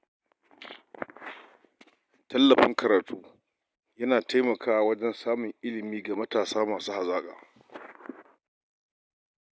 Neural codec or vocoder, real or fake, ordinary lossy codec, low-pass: none; real; none; none